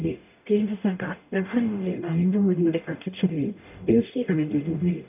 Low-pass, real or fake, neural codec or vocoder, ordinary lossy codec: 3.6 kHz; fake; codec, 44.1 kHz, 0.9 kbps, DAC; none